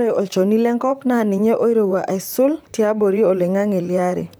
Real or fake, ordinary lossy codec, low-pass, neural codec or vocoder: fake; none; none; vocoder, 44.1 kHz, 128 mel bands, Pupu-Vocoder